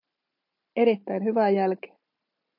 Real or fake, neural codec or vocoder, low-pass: real; none; 5.4 kHz